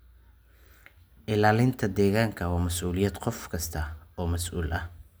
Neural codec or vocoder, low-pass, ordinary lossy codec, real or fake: none; none; none; real